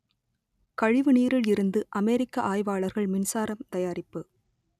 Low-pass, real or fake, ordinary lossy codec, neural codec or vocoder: 14.4 kHz; real; none; none